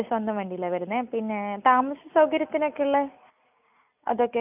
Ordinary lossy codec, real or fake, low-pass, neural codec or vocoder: none; real; 3.6 kHz; none